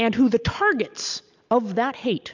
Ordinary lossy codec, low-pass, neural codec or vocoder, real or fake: MP3, 64 kbps; 7.2 kHz; none; real